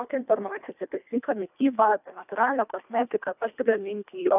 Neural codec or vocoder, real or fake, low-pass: codec, 24 kHz, 1.5 kbps, HILCodec; fake; 3.6 kHz